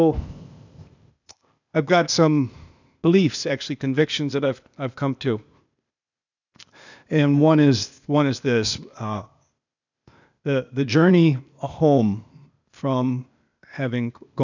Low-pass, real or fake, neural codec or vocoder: 7.2 kHz; fake; codec, 16 kHz, 0.8 kbps, ZipCodec